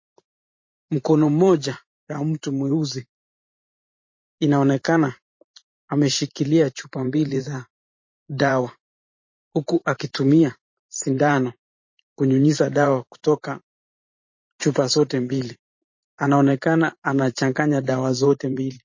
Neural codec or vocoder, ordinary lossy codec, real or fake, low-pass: vocoder, 44.1 kHz, 128 mel bands every 512 samples, BigVGAN v2; MP3, 32 kbps; fake; 7.2 kHz